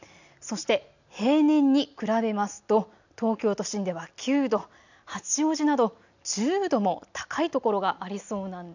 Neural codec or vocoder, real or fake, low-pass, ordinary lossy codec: none; real; 7.2 kHz; none